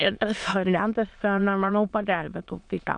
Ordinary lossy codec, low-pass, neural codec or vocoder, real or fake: AAC, 64 kbps; 9.9 kHz; autoencoder, 22.05 kHz, a latent of 192 numbers a frame, VITS, trained on many speakers; fake